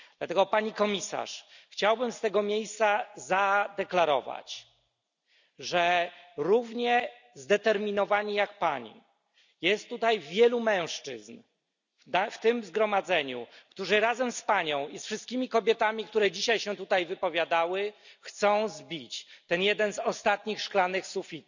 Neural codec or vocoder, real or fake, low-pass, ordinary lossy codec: none; real; 7.2 kHz; none